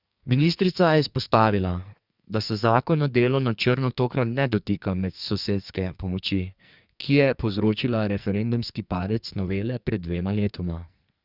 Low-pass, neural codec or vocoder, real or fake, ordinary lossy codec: 5.4 kHz; codec, 44.1 kHz, 2.6 kbps, SNAC; fake; Opus, 64 kbps